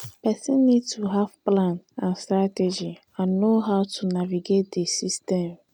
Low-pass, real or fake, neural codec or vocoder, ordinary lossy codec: 19.8 kHz; real; none; none